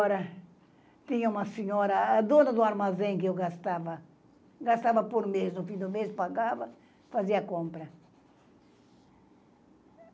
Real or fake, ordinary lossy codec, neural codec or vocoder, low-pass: real; none; none; none